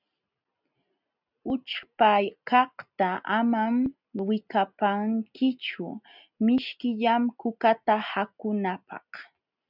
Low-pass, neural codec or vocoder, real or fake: 5.4 kHz; none; real